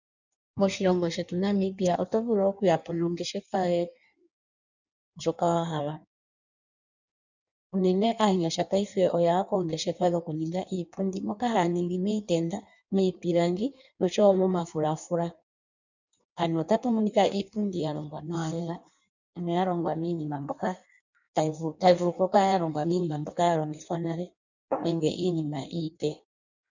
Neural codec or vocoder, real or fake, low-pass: codec, 16 kHz in and 24 kHz out, 1.1 kbps, FireRedTTS-2 codec; fake; 7.2 kHz